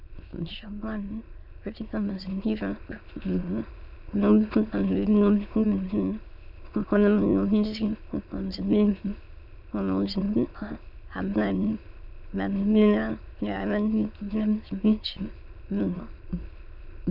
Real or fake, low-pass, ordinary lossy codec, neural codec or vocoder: fake; 5.4 kHz; MP3, 48 kbps; autoencoder, 22.05 kHz, a latent of 192 numbers a frame, VITS, trained on many speakers